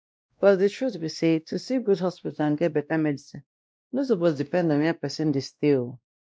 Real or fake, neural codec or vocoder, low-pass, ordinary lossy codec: fake; codec, 16 kHz, 1 kbps, X-Codec, WavLM features, trained on Multilingual LibriSpeech; none; none